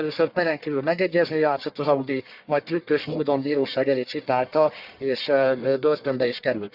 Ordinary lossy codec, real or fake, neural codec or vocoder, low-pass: Opus, 64 kbps; fake; codec, 44.1 kHz, 1.7 kbps, Pupu-Codec; 5.4 kHz